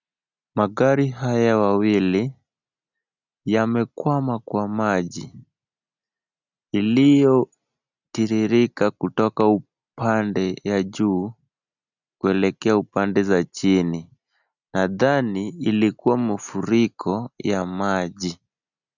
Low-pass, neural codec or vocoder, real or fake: 7.2 kHz; none; real